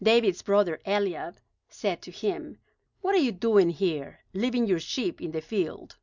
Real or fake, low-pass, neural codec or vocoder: real; 7.2 kHz; none